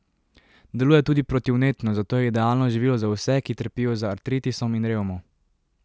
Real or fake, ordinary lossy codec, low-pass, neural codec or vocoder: real; none; none; none